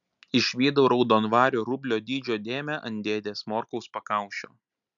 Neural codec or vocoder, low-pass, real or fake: none; 7.2 kHz; real